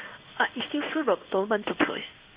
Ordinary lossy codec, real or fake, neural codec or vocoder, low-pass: Opus, 64 kbps; fake; codec, 16 kHz in and 24 kHz out, 1 kbps, XY-Tokenizer; 3.6 kHz